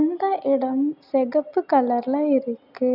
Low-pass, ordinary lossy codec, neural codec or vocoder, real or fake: 5.4 kHz; none; none; real